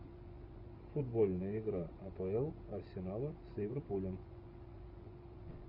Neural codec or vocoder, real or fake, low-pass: vocoder, 24 kHz, 100 mel bands, Vocos; fake; 5.4 kHz